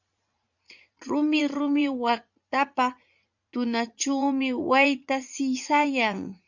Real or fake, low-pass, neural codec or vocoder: fake; 7.2 kHz; vocoder, 44.1 kHz, 80 mel bands, Vocos